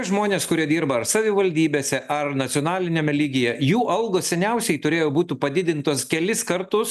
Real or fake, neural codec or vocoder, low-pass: real; none; 14.4 kHz